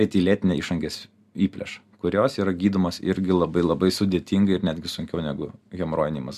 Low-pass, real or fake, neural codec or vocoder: 14.4 kHz; real; none